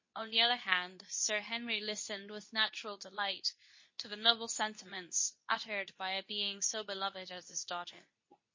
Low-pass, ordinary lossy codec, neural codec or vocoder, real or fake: 7.2 kHz; MP3, 32 kbps; codec, 24 kHz, 0.9 kbps, WavTokenizer, medium speech release version 2; fake